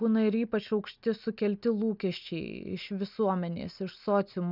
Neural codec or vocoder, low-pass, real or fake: none; 5.4 kHz; real